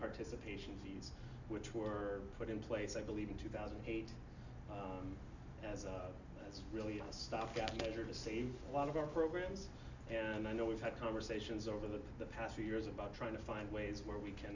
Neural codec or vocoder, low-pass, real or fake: none; 7.2 kHz; real